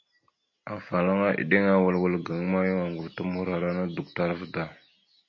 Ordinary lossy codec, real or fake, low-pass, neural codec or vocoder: MP3, 32 kbps; real; 7.2 kHz; none